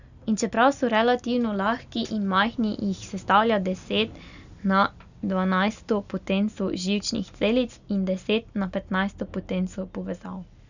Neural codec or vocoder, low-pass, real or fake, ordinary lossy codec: none; 7.2 kHz; real; none